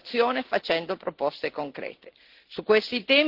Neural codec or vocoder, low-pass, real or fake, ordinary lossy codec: none; 5.4 kHz; real; Opus, 16 kbps